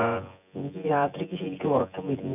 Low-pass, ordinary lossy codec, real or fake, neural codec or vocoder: 3.6 kHz; none; fake; vocoder, 24 kHz, 100 mel bands, Vocos